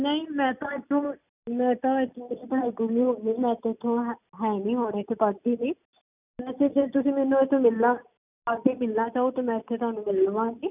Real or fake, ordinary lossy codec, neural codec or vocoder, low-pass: real; none; none; 3.6 kHz